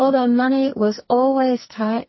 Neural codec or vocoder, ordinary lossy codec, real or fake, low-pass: codec, 44.1 kHz, 2.6 kbps, SNAC; MP3, 24 kbps; fake; 7.2 kHz